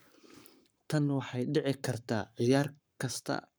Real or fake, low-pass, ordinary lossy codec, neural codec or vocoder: fake; none; none; codec, 44.1 kHz, 7.8 kbps, Pupu-Codec